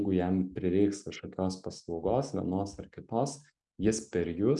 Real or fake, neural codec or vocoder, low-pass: real; none; 10.8 kHz